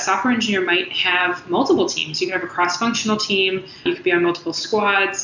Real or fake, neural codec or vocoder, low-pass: real; none; 7.2 kHz